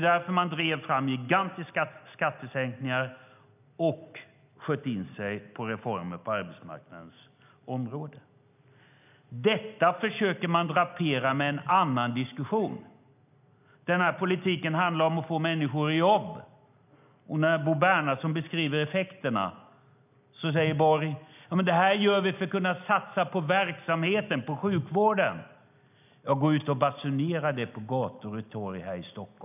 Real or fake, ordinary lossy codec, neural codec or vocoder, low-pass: real; none; none; 3.6 kHz